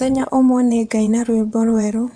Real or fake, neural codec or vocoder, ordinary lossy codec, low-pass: fake; vocoder, 22.05 kHz, 80 mel bands, WaveNeXt; none; 9.9 kHz